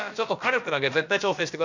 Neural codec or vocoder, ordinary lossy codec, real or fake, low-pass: codec, 16 kHz, about 1 kbps, DyCAST, with the encoder's durations; none; fake; 7.2 kHz